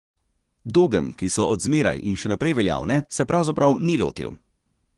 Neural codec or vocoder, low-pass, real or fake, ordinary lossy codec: codec, 24 kHz, 1 kbps, SNAC; 10.8 kHz; fake; Opus, 24 kbps